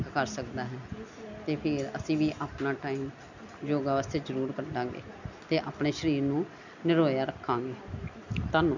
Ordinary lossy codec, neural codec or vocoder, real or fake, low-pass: none; none; real; 7.2 kHz